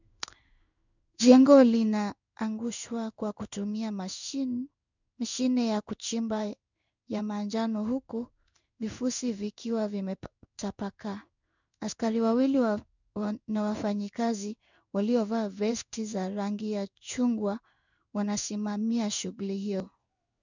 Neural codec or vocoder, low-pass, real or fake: codec, 16 kHz in and 24 kHz out, 1 kbps, XY-Tokenizer; 7.2 kHz; fake